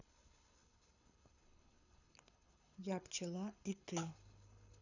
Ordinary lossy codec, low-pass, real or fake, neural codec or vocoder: none; 7.2 kHz; fake; codec, 24 kHz, 6 kbps, HILCodec